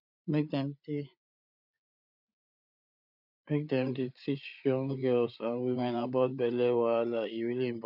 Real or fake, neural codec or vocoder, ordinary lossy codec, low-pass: fake; codec, 16 kHz, 8 kbps, FreqCodec, larger model; none; 5.4 kHz